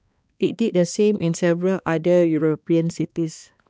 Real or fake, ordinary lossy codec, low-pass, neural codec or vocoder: fake; none; none; codec, 16 kHz, 2 kbps, X-Codec, HuBERT features, trained on balanced general audio